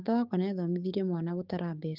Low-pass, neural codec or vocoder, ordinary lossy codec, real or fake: 5.4 kHz; codec, 16 kHz, 6 kbps, DAC; Opus, 24 kbps; fake